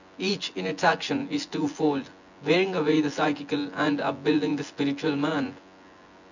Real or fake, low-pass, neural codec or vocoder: fake; 7.2 kHz; vocoder, 24 kHz, 100 mel bands, Vocos